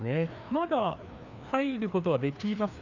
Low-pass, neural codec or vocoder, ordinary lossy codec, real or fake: 7.2 kHz; codec, 16 kHz, 2 kbps, FreqCodec, larger model; none; fake